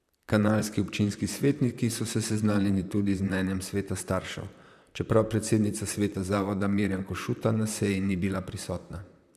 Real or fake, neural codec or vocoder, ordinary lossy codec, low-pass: fake; vocoder, 44.1 kHz, 128 mel bands, Pupu-Vocoder; none; 14.4 kHz